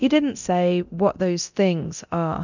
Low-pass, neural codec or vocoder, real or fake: 7.2 kHz; codec, 24 kHz, 0.9 kbps, DualCodec; fake